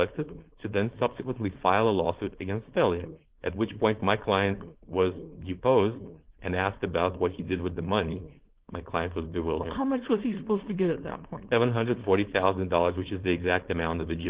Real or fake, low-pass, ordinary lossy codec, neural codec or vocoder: fake; 3.6 kHz; Opus, 16 kbps; codec, 16 kHz, 4.8 kbps, FACodec